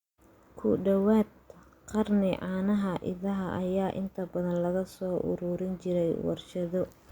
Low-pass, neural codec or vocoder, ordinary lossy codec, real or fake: 19.8 kHz; none; Opus, 64 kbps; real